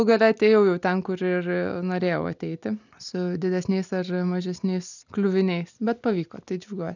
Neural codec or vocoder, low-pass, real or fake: none; 7.2 kHz; real